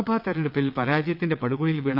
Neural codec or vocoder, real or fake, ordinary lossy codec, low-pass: vocoder, 22.05 kHz, 80 mel bands, WaveNeXt; fake; none; 5.4 kHz